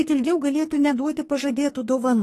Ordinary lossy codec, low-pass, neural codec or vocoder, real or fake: AAC, 48 kbps; 14.4 kHz; codec, 44.1 kHz, 2.6 kbps, SNAC; fake